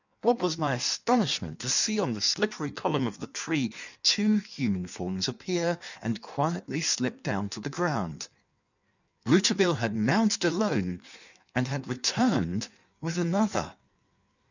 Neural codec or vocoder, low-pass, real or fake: codec, 16 kHz in and 24 kHz out, 1.1 kbps, FireRedTTS-2 codec; 7.2 kHz; fake